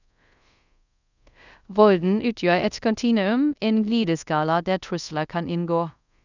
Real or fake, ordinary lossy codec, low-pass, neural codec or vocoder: fake; none; 7.2 kHz; codec, 24 kHz, 0.5 kbps, DualCodec